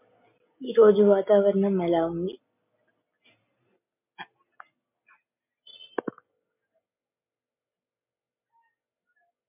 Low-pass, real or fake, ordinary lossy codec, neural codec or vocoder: 3.6 kHz; real; MP3, 32 kbps; none